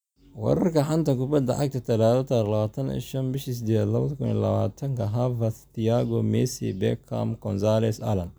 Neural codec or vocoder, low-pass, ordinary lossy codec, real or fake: none; none; none; real